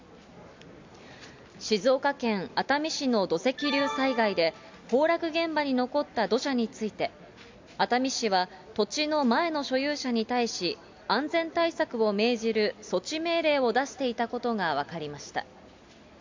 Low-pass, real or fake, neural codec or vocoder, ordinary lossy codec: 7.2 kHz; real; none; MP3, 64 kbps